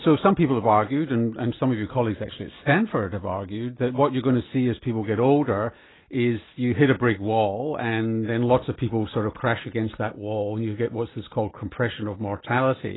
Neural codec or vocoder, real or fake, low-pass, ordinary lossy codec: none; real; 7.2 kHz; AAC, 16 kbps